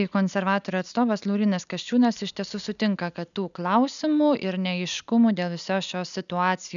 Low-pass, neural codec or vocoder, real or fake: 7.2 kHz; none; real